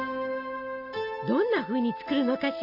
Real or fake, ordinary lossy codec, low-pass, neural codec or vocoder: real; none; 5.4 kHz; none